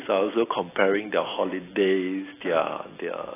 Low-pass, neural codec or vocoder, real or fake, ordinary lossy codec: 3.6 kHz; none; real; AAC, 16 kbps